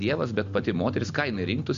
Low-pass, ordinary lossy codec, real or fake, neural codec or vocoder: 7.2 kHz; MP3, 48 kbps; real; none